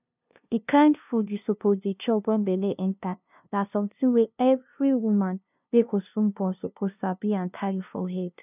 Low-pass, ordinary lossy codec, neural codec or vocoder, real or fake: 3.6 kHz; none; codec, 16 kHz, 0.5 kbps, FunCodec, trained on LibriTTS, 25 frames a second; fake